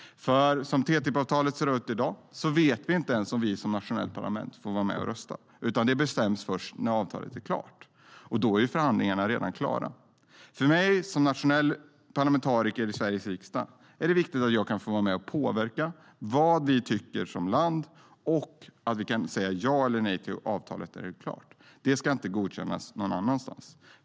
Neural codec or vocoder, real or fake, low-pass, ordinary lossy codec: none; real; none; none